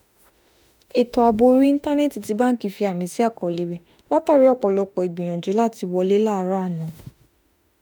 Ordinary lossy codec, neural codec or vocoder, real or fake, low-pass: none; autoencoder, 48 kHz, 32 numbers a frame, DAC-VAE, trained on Japanese speech; fake; none